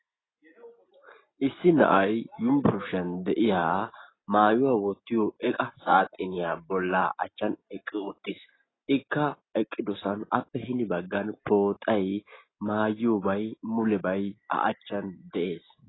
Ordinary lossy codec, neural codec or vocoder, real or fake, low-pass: AAC, 16 kbps; none; real; 7.2 kHz